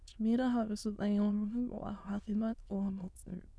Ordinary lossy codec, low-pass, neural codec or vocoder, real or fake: none; none; autoencoder, 22.05 kHz, a latent of 192 numbers a frame, VITS, trained on many speakers; fake